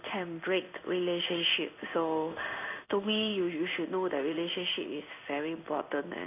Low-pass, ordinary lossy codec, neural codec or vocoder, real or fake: 3.6 kHz; none; codec, 16 kHz in and 24 kHz out, 1 kbps, XY-Tokenizer; fake